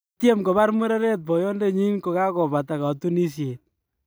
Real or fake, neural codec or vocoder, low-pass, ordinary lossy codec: real; none; none; none